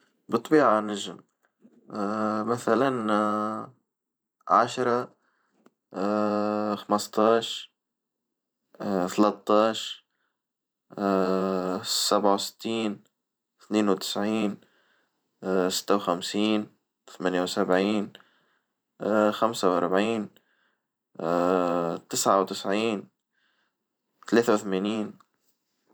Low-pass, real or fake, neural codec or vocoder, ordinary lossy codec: none; fake; vocoder, 44.1 kHz, 128 mel bands every 512 samples, BigVGAN v2; none